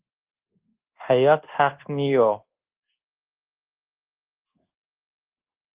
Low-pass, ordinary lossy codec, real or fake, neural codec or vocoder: 3.6 kHz; Opus, 16 kbps; fake; codec, 24 kHz, 1.2 kbps, DualCodec